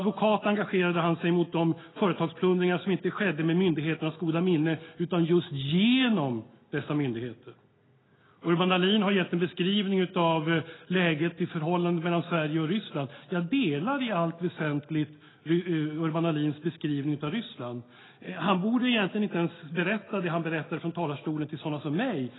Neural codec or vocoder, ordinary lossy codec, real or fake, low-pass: none; AAC, 16 kbps; real; 7.2 kHz